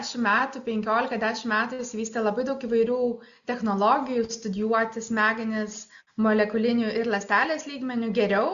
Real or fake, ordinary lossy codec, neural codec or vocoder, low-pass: real; MP3, 96 kbps; none; 7.2 kHz